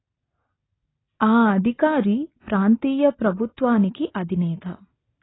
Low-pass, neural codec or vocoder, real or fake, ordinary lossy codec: 7.2 kHz; none; real; AAC, 16 kbps